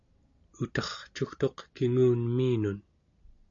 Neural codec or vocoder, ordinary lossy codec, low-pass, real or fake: none; AAC, 48 kbps; 7.2 kHz; real